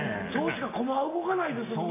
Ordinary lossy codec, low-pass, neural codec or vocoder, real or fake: MP3, 24 kbps; 3.6 kHz; none; real